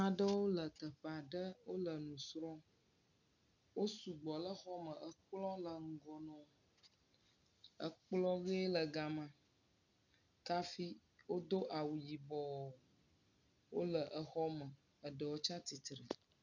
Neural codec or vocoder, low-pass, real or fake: none; 7.2 kHz; real